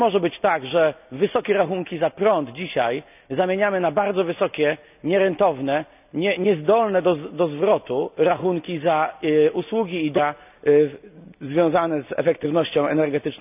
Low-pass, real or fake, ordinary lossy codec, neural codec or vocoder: 3.6 kHz; real; none; none